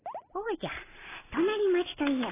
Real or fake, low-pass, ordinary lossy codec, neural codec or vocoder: real; 3.6 kHz; AAC, 16 kbps; none